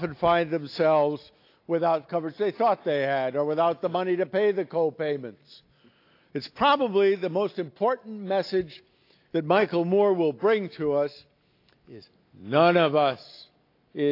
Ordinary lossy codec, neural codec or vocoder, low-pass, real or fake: AAC, 32 kbps; none; 5.4 kHz; real